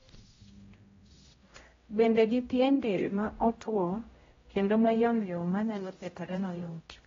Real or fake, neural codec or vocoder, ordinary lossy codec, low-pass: fake; codec, 16 kHz, 0.5 kbps, X-Codec, HuBERT features, trained on general audio; AAC, 24 kbps; 7.2 kHz